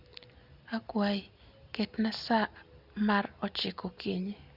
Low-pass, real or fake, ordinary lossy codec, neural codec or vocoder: 5.4 kHz; real; Opus, 64 kbps; none